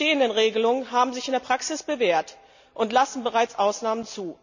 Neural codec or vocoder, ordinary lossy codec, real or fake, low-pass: none; none; real; 7.2 kHz